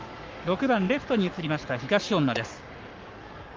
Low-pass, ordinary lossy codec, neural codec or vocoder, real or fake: 7.2 kHz; Opus, 24 kbps; codec, 44.1 kHz, 7.8 kbps, Pupu-Codec; fake